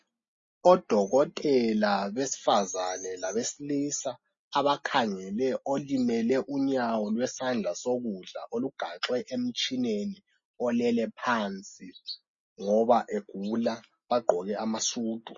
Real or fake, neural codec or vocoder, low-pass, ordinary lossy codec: real; none; 7.2 kHz; MP3, 32 kbps